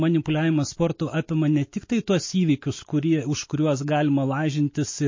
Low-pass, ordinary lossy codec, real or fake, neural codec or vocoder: 7.2 kHz; MP3, 32 kbps; real; none